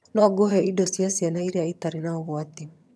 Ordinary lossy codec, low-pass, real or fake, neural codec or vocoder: none; none; fake; vocoder, 22.05 kHz, 80 mel bands, HiFi-GAN